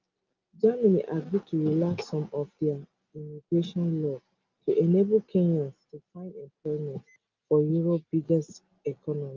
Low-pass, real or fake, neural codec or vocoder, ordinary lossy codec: 7.2 kHz; real; none; Opus, 24 kbps